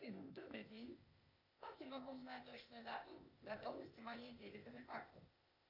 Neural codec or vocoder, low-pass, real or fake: codec, 16 kHz, 0.8 kbps, ZipCodec; 5.4 kHz; fake